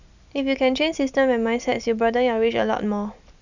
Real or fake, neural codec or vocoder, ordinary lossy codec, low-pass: real; none; none; 7.2 kHz